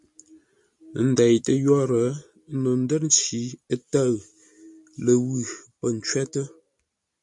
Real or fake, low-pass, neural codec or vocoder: real; 10.8 kHz; none